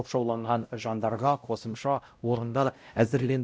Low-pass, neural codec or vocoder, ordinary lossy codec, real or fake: none; codec, 16 kHz, 0.5 kbps, X-Codec, WavLM features, trained on Multilingual LibriSpeech; none; fake